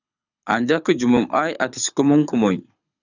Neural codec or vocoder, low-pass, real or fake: codec, 24 kHz, 6 kbps, HILCodec; 7.2 kHz; fake